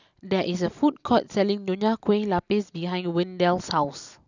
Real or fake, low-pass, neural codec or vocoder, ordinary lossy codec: real; 7.2 kHz; none; none